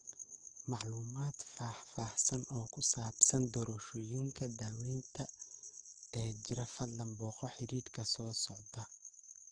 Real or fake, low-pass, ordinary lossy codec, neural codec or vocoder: fake; 9.9 kHz; Opus, 16 kbps; codec, 44.1 kHz, 7.8 kbps, DAC